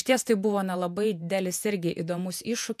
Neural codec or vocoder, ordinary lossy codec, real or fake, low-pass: vocoder, 48 kHz, 128 mel bands, Vocos; MP3, 96 kbps; fake; 14.4 kHz